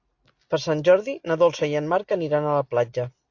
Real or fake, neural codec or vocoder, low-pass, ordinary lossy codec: real; none; 7.2 kHz; AAC, 48 kbps